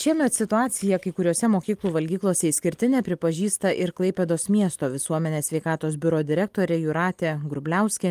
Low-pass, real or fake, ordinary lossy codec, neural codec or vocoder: 14.4 kHz; real; Opus, 32 kbps; none